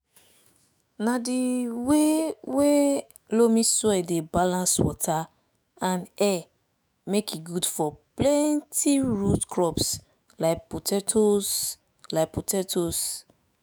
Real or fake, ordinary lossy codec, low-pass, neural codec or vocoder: fake; none; none; autoencoder, 48 kHz, 128 numbers a frame, DAC-VAE, trained on Japanese speech